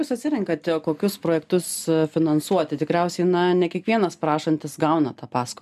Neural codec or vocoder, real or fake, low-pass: none; real; 14.4 kHz